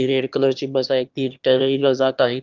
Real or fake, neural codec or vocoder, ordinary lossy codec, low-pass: fake; autoencoder, 22.05 kHz, a latent of 192 numbers a frame, VITS, trained on one speaker; Opus, 32 kbps; 7.2 kHz